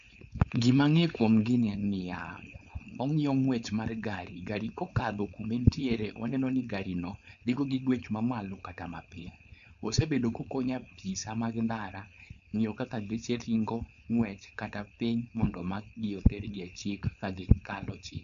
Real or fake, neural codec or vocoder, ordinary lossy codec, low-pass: fake; codec, 16 kHz, 4.8 kbps, FACodec; none; 7.2 kHz